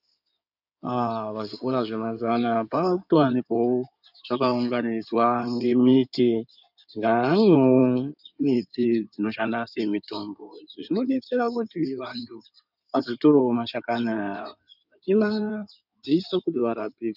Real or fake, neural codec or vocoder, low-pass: fake; codec, 16 kHz in and 24 kHz out, 2.2 kbps, FireRedTTS-2 codec; 5.4 kHz